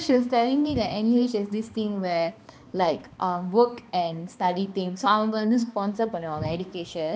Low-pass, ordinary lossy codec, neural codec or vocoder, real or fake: none; none; codec, 16 kHz, 2 kbps, X-Codec, HuBERT features, trained on balanced general audio; fake